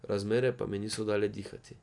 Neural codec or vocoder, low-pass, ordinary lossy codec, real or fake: none; 10.8 kHz; none; real